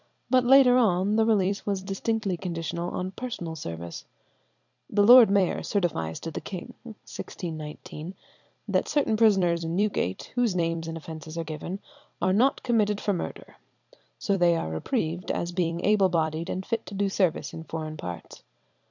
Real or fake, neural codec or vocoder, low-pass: fake; vocoder, 44.1 kHz, 128 mel bands every 256 samples, BigVGAN v2; 7.2 kHz